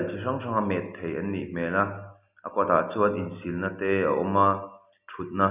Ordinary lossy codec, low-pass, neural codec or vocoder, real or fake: none; 3.6 kHz; none; real